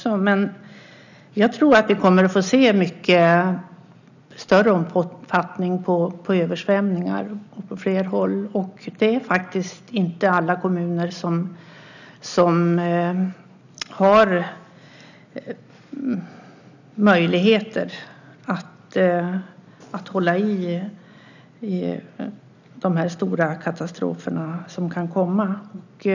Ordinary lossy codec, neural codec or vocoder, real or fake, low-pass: none; none; real; 7.2 kHz